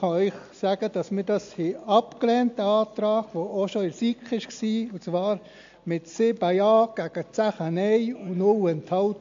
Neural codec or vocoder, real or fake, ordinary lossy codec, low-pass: none; real; MP3, 48 kbps; 7.2 kHz